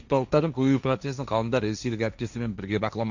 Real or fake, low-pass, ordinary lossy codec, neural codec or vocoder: fake; 7.2 kHz; none; codec, 16 kHz, 1.1 kbps, Voila-Tokenizer